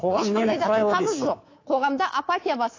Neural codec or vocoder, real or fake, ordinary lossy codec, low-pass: codec, 24 kHz, 3.1 kbps, DualCodec; fake; AAC, 32 kbps; 7.2 kHz